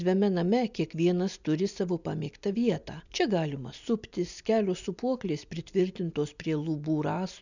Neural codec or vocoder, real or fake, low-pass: none; real; 7.2 kHz